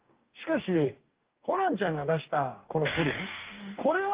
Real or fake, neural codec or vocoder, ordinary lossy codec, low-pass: fake; codec, 44.1 kHz, 2.6 kbps, DAC; Opus, 24 kbps; 3.6 kHz